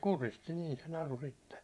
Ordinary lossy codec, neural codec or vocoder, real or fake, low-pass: none; vocoder, 24 kHz, 100 mel bands, Vocos; fake; none